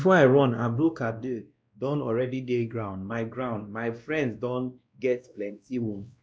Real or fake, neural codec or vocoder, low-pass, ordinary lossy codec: fake; codec, 16 kHz, 1 kbps, X-Codec, WavLM features, trained on Multilingual LibriSpeech; none; none